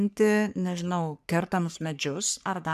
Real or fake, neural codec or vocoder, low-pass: fake; codec, 44.1 kHz, 3.4 kbps, Pupu-Codec; 14.4 kHz